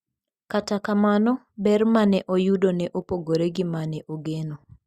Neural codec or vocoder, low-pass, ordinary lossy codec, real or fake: none; 14.4 kHz; Opus, 64 kbps; real